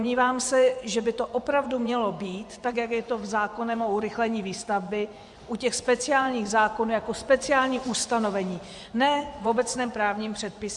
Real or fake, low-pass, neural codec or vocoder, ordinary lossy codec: fake; 10.8 kHz; vocoder, 24 kHz, 100 mel bands, Vocos; Opus, 64 kbps